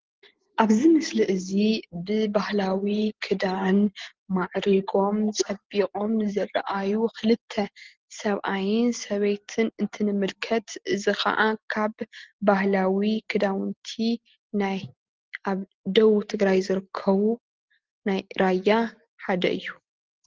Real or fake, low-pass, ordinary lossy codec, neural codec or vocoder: real; 7.2 kHz; Opus, 16 kbps; none